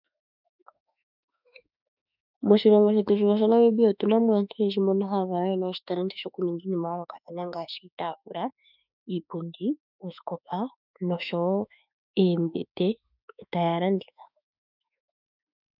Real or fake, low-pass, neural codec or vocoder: fake; 5.4 kHz; autoencoder, 48 kHz, 32 numbers a frame, DAC-VAE, trained on Japanese speech